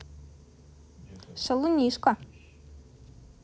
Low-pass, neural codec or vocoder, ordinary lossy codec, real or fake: none; none; none; real